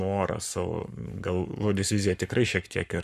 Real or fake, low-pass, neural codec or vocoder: fake; 14.4 kHz; codec, 44.1 kHz, 7.8 kbps, Pupu-Codec